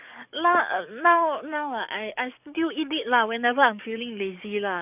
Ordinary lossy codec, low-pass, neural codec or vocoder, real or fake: none; 3.6 kHz; codec, 44.1 kHz, 7.8 kbps, DAC; fake